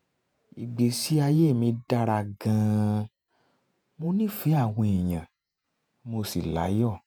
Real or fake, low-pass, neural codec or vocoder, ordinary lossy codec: fake; none; vocoder, 48 kHz, 128 mel bands, Vocos; none